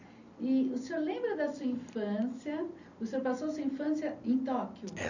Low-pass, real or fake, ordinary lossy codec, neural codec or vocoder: 7.2 kHz; real; none; none